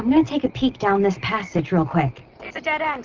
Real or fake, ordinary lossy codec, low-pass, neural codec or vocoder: real; Opus, 32 kbps; 7.2 kHz; none